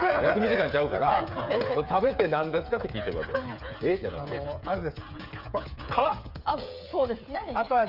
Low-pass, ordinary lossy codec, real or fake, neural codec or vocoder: 5.4 kHz; none; fake; codec, 16 kHz, 8 kbps, FreqCodec, smaller model